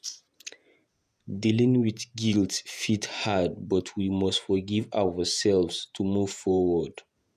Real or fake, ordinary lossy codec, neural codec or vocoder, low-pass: fake; none; vocoder, 48 kHz, 128 mel bands, Vocos; 14.4 kHz